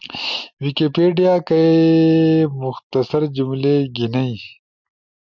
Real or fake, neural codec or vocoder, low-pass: real; none; 7.2 kHz